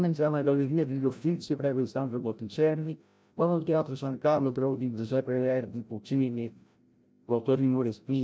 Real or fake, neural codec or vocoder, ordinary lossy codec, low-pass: fake; codec, 16 kHz, 0.5 kbps, FreqCodec, larger model; none; none